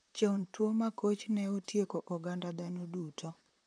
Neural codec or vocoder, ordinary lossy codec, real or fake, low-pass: vocoder, 44.1 kHz, 128 mel bands, Pupu-Vocoder; none; fake; 9.9 kHz